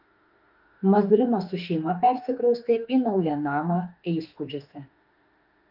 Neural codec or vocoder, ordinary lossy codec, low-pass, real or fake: autoencoder, 48 kHz, 32 numbers a frame, DAC-VAE, trained on Japanese speech; Opus, 32 kbps; 5.4 kHz; fake